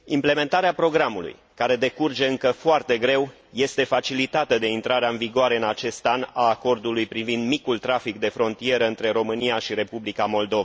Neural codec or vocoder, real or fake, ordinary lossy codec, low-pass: none; real; none; none